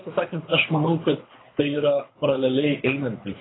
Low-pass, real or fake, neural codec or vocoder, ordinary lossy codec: 7.2 kHz; fake; codec, 24 kHz, 6 kbps, HILCodec; AAC, 16 kbps